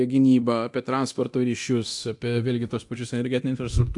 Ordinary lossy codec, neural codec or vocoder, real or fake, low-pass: AAC, 64 kbps; codec, 24 kHz, 0.9 kbps, DualCodec; fake; 10.8 kHz